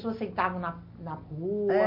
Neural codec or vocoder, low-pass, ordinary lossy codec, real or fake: none; 5.4 kHz; MP3, 32 kbps; real